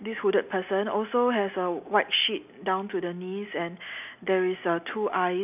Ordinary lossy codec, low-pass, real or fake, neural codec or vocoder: none; 3.6 kHz; real; none